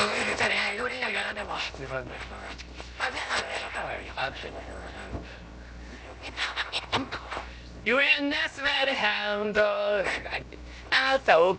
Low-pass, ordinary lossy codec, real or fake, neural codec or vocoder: none; none; fake; codec, 16 kHz, 0.7 kbps, FocalCodec